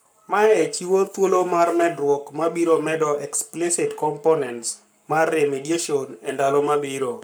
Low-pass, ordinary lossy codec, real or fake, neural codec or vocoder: none; none; fake; codec, 44.1 kHz, 7.8 kbps, Pupu-Codec